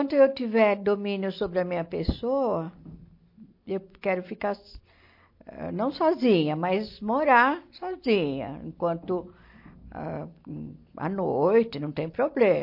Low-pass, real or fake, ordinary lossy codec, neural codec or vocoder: 5.4 kHz; real; MP3, 32 kbps; none